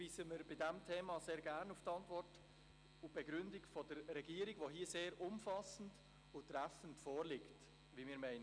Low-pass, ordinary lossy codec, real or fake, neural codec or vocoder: 9.9 kHz; none; real; none